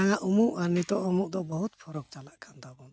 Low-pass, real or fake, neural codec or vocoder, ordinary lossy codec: none; real; none; none